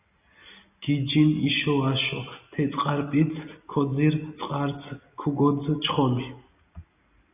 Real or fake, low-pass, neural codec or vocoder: real; 3.6 kHz; none